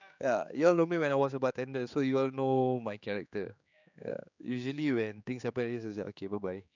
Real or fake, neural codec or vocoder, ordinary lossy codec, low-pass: fake; codec, 16 kHz, 4 kbps, X-Codec, HuBERT features, trained on general audio; none; 7.2 kHz